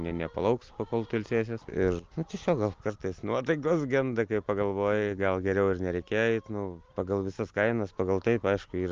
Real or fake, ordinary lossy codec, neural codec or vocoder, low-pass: real; Opus, 24 kbps; none; 7.2 kHz